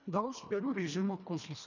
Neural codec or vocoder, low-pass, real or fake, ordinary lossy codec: codec, 24 kHz, 1.5 kbps, HILCodec; 7.2 kHz; fake; none